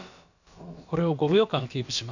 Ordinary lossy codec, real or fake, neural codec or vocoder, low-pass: none; fake; codec, 16 kHz, about 1 kbps, DyCAST, with the encoder's durations; 7.2 kHz